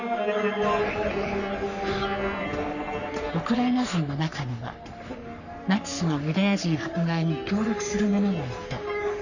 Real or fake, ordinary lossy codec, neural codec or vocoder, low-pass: fake; none; codec, 44.1 kHz, 3.4 kbps, Pupu-Codec; 7.2 kHz